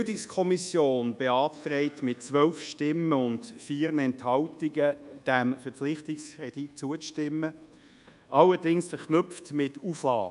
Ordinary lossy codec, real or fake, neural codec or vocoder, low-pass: none; fake; codec, 24 kHz, 1.2 kbps, DualCodec; 10.8 kHz